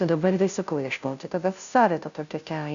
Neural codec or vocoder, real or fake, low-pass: codec, 16 kHz, 0.5 kbps, FunCodec, trained on Chinese and English, 25 frames a second; fake; 7.2 kHz